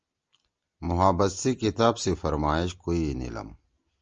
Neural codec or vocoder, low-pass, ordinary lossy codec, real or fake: none; 7.2 kHz; Opus, 24 kbps; real